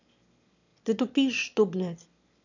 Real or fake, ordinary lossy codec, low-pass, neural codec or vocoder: fake; none; 7.2 kHz; autoencoder, 22.05 kHz, a latent of 192 numbers a frame, VITS, trained on one speaker